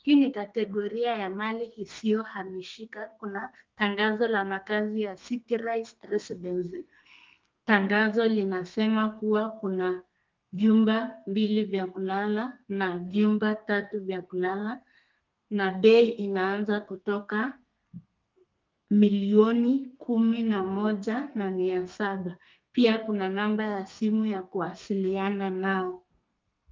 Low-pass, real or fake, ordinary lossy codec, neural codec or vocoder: 7.2 kHz; fake; Opus, 24 kbps; codec, 32 kHz, 1.9 kbps, SNAC